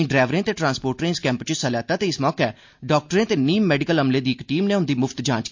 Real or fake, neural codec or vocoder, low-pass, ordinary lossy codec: real; none; 7.2 kHz; MP3, 48 kbps